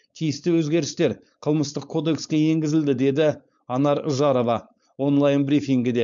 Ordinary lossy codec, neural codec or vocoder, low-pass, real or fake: MP3, 64 kbps; codec, 16 kHz, 4.8 kbps, FACodec; 7.2 kHz; fake